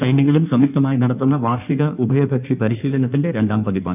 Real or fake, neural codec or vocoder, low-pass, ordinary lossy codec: fake; codec, 16 kHz in and 24 kHz out, 1.1 kbps, FireRedTTS-2 codec; 3.6 kHz; none